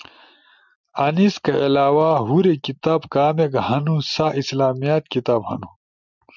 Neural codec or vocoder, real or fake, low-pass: none; real; 7.2 kHz